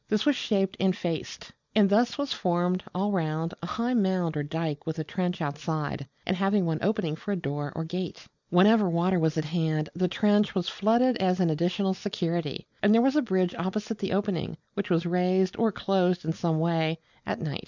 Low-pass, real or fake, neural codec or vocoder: 7.2 kHz; real; none